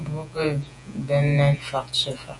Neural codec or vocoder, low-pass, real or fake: vocoder, 48 kHz, 128 mel bands, Vocos; 10.8 kHz; fake